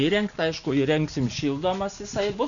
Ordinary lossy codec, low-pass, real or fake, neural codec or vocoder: MP3, 96 kbps; 7.2 kHz; real; none